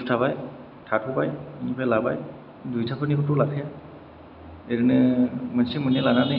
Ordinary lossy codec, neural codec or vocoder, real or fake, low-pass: none; none; real; 5.4 kHz